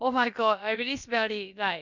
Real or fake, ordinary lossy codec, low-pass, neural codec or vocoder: fake; none; 7.2 kHz; codec, 16 kHz, about 1 kbps, DyCAST, with the encoder's durations